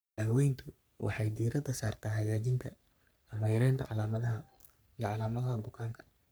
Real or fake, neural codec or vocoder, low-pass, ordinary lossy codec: fake; codec, 44.1 kHz, 3.4 kbps, Pupu-Codec; none; none